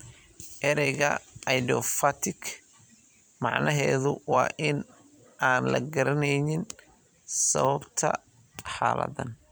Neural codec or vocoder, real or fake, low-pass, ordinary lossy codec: vocoder, 44.1 kHz, 128 mel bands every 256 samples, BigVGAN v2; fake; none; none